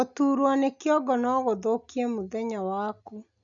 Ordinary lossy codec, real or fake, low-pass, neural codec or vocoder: none; real; 7.2 kHz; none